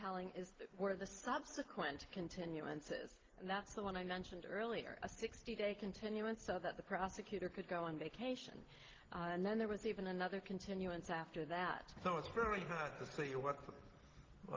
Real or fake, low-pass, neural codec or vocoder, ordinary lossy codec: real; 7.2 kHz; none; Opus, 16 kbps